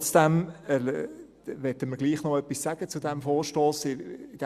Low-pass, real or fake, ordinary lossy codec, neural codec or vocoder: 14.4 kHz; real; Opus, 64 kbps; none